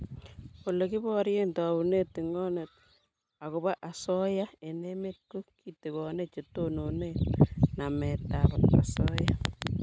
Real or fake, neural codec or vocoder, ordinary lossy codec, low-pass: real; none; none; none